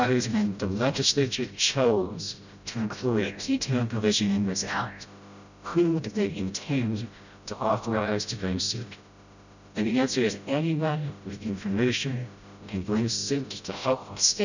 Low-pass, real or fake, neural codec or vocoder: 7.2 kHz; fake; codec, 16 kHz, 0.5 kbps, FreqCodec, smaller model